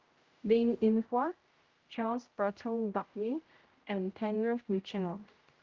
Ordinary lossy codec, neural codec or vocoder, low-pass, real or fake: Opus, 32 kbps; codec, 16 kHz, 0.5 kbps, X-Codec, HuBERT features, trained on general audio; 7.2 kHz; fake